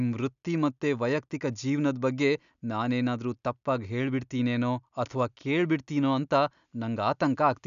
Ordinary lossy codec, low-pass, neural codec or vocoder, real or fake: none; 7.2 kHz; none; real